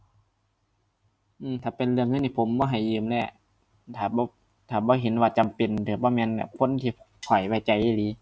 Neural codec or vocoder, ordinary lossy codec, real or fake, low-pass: none; none; real; none